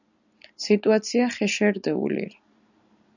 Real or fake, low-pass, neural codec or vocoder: real; 7.2 kHz; none